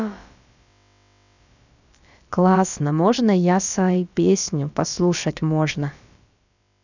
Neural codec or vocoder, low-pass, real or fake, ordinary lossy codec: codec, 16 kHz, about 1 kbps, DyCAST, with the encoder's durations; 7.2 kHz; fake; none